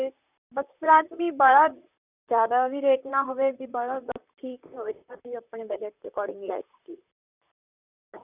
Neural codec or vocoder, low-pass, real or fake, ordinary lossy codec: vocoder, 44.1 kHz, 128 mel bands, Pupu-Vocoder; 3.6 kHz; fake; none